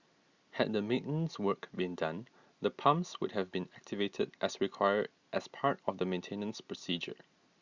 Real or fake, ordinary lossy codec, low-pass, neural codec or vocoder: real; Opus, 64 kbps; 7.2 kHz; none